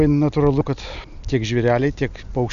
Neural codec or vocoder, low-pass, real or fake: none; 7.2 kHz; real